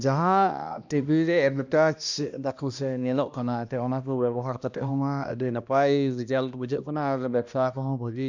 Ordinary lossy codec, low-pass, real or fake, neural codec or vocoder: none; 7.2 kHz; fake; codec, 16 kHz, 1 kbps, X-Codec, HuBERT features, trained on balanced general audio